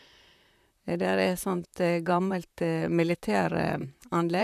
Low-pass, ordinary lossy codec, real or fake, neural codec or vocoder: 14.4 kHz; none; fake; vocoder, 44.1 kHz, 128 mel bands, Pupu-Vocoder